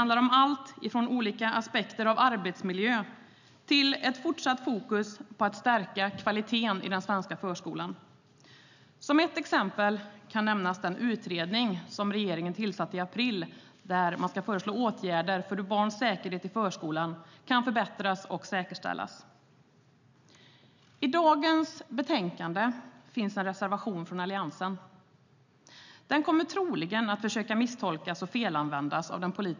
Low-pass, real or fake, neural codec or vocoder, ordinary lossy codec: 7.2 kHz; real; none; none